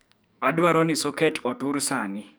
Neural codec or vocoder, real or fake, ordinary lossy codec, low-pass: codec, 44.1 kHz, 2.6 kbps, SNAC; fake; none; none